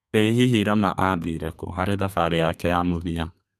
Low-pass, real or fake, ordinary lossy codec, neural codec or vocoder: 14.4 kHz; fake; none; codec, 32 kHz, 1.9 kbps, SNAC